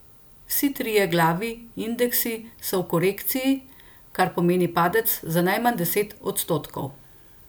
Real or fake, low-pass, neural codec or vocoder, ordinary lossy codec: real; none; none; none